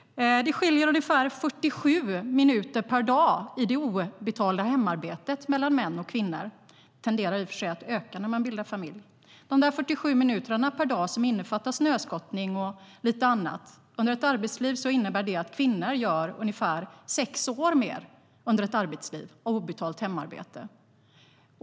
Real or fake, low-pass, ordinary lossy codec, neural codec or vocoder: real; none; none; none